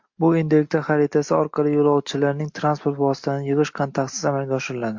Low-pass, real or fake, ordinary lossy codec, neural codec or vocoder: 7.2 kHz; real; MP3, 48 kbps; none